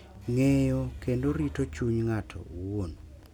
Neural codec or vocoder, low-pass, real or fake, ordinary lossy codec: none; 19.8 kHz; real; none